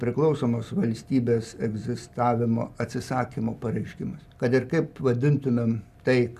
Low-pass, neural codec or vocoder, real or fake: 14.4 kHz; none; real